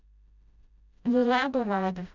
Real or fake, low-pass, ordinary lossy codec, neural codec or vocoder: fake; 7.2 kHz; none; codec, 16 kHz, 0.5 kbps, FreqCodec, smaller model